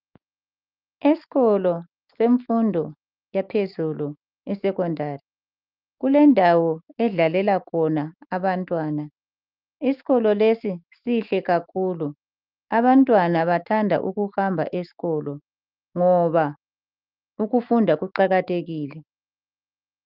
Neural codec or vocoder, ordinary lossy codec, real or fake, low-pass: autoencoder, 48 kHz, 128 numbers a frame, DAC-VAE, trained on Japanese speech; Opus, 24 kbps; fake; 5.4 kHz